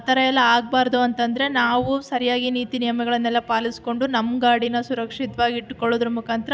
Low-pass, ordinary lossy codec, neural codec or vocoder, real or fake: none; none; none; real